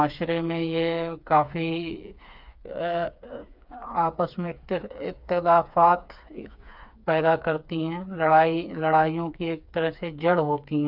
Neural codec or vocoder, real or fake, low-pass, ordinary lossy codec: codec, 16 kHz, 4 kbps, FreqCodec, smaller model; fake; 5.4 kHz; none